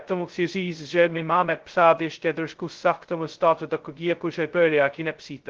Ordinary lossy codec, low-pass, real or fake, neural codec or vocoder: Opus, 16 kbps; 7.2 kHz; fake; codec, 16 kHz, 0.2 kbps, FocalCodec